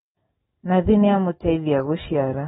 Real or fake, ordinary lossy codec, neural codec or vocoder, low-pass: real; AAC, 16 kbps; none; 7.2 kHz